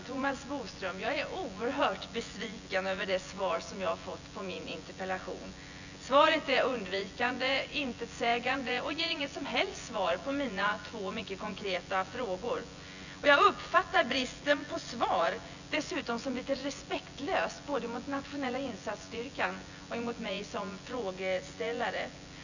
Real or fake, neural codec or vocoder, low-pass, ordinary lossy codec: fake; vocoder, 24 kHz, 100 mel bands, Vocos; 7.2 kHz; none